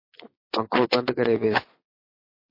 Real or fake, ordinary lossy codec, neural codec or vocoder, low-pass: fake; MP3, 32 kbps; vocoder, 22.05 kHz, 80 mel bands, Vocos; 5.4 kHz